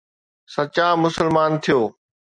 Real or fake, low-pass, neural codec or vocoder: real; 9.9 kHz; none